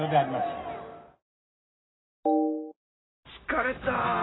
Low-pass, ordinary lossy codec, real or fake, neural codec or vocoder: 7.2 kHz; AAC, 16 kbps; real; none